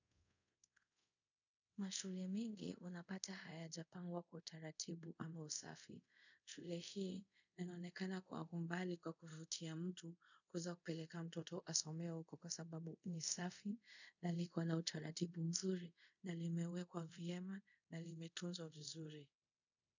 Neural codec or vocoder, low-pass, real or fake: codec, 24 kHz, 0.5 kbps, DualCodec; 7.2 kHz; fake